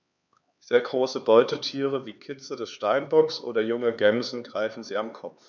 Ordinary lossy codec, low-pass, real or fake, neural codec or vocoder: none; 7.2 kHz; fake; codec, 16 kHz, 4 kbps, X-Codec, HuBERT features, trained on LibriSpeech